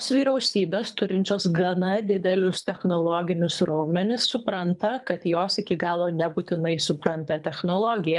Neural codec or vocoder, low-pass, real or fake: codec, 24 kHz, 3 kbps, HILCodec; 10.8 kHz; fake